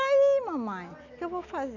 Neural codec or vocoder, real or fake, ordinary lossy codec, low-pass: none; real; none; 7.2 kHz